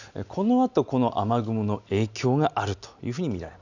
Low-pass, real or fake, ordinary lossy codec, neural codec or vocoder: 7.2 kHz; real; none; none